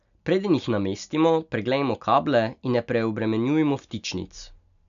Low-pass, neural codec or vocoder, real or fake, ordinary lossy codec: 7.2 kHz; none; real; none